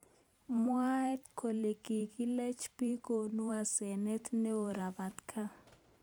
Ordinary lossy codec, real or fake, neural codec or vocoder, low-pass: none; fake; vocoder, 44.1 kHz, 128 mel bands every 512 samples, BigVGAN v2; none